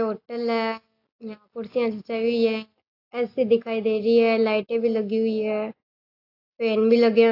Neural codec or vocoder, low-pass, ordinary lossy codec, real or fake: none; 5.4 kHz; AAC, 32 kbps; real